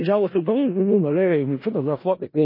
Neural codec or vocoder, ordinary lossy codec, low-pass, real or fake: codec, 16 kHz in and 24 kHz out, 0.4 kbps, LongCat-Audio-Codec, four codebook decoder; MP3, 24 kbps; 5.4 kHz; fake